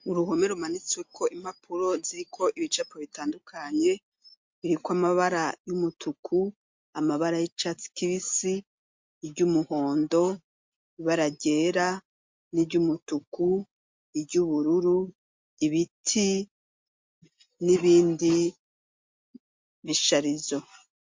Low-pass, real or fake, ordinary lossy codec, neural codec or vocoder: 7.2 kHz; real; MP3, 48 kbps; none